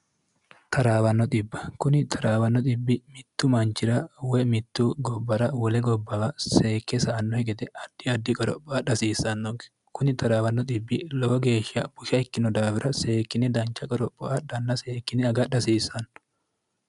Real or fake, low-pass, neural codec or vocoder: real; 10.8 kHz; none